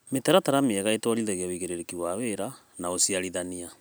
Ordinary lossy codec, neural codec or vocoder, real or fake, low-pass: none; none; real; none